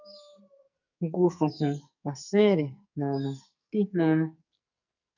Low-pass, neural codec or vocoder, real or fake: 7.2 kHz; codec, 44.1 kHz, 2.6 kbps, SNAC; fake